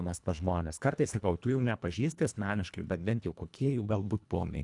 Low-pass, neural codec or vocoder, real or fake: 10.8 kHz; codec, 24 kHz, 1.5 kbps, HILCodec; fake